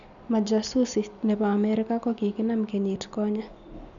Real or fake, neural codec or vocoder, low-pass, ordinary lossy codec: real; none; 7.2 kHz; none